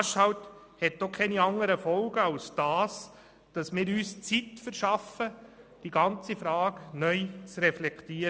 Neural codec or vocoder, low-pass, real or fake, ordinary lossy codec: none; none; real; none